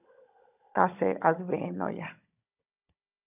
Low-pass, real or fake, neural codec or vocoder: 3.6 kHz; fake; codec, 16 kHz, 16 kbps, FunCodec, trained on Chinese and English, 50 frames a second